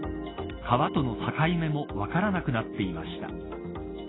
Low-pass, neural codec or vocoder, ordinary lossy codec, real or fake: 7.2 kHz; none; AAC, 16 kbps; real